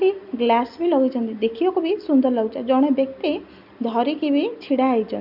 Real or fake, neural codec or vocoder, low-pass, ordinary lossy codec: real; none; 5.4 kHz; none